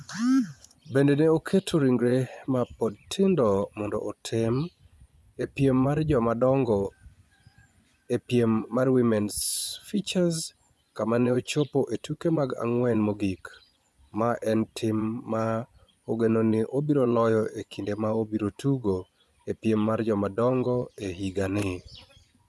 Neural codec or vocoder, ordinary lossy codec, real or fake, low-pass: vocoder, 24 kHz, 100 mel bands, Vocos; none; fake; none